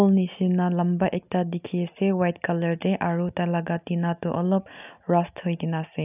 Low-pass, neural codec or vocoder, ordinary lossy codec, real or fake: 3.6 kHz; none; none; real